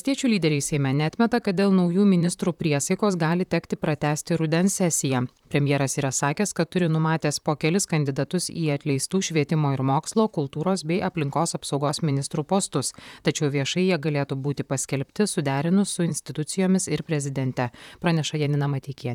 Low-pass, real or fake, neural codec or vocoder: 19.8 kHz; fake; vocoder, 44.1 kHz, 128 mel bands, Pupu-Vocoder